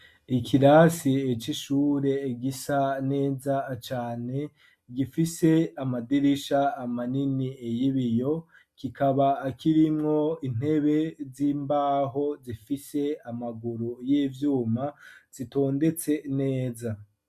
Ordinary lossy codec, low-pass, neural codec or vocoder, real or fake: MP3, 96 kbps; 14.4 kHz; none; real